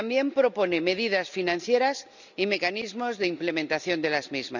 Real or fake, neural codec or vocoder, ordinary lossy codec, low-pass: real; none; none; 7.2 kHz